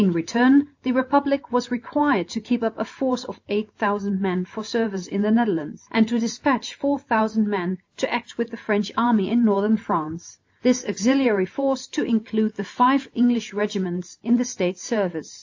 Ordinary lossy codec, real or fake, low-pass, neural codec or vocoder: AAC, 48 kbps; real; 7.2 kHz; none